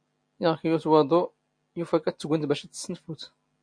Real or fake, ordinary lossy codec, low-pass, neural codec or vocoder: real; MP3, 48 kbps; 9.9 kHz; none